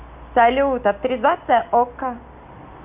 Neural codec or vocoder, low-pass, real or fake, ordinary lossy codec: none; 3.6 kHz; real; none